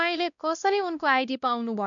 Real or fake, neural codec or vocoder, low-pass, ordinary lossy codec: fake; codec, 16 kHz, 1 kbps, X-Codec, WavLM features, trained on Multilingual LibriSpeech; 7.2 kHz; none